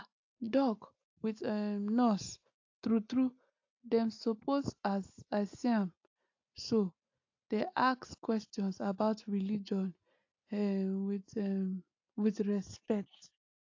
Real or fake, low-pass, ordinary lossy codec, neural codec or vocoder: real; 7.2 kHz; AAC, 48 kbps; none